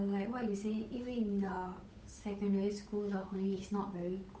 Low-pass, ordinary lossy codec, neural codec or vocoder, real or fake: none; none; codec, 16 kHz, 8 kbps, FunCodec, trained on Chinese and English, 25 frames a second; fake